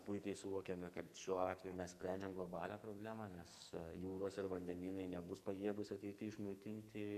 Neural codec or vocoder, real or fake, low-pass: codec, 44.1 kHz, 2.6 kbps, SNAC; fake; 14.4 kHz